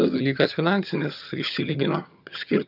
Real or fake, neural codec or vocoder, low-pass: fake; vocoder, 22.05 kHz, 80 mel bands, HiFi-GAN; 5.4 kHz